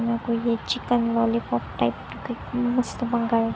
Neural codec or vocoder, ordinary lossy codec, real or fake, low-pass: none; none; real; none